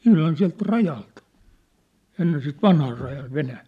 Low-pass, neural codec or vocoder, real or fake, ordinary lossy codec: 14.4 kHz; none; real; none